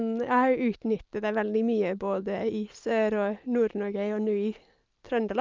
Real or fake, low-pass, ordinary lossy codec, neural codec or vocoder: real; 7.2 kHz; Opus, 24 kbps; none